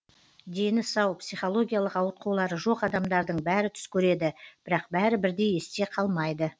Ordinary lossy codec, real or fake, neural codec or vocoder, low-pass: none; real; none; none